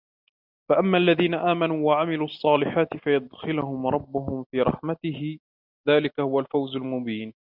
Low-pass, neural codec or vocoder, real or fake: 5.4 kHz; none; real